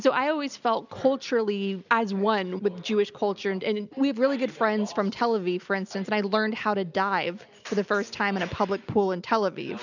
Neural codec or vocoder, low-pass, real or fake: none; 7.2 kHz; real